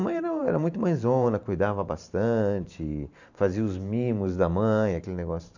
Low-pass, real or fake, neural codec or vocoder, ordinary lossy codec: 7.2 kHz; fake; vocoder, 44.1 kHz, 128 mel bands every 512 samples, BigVGAN v2; none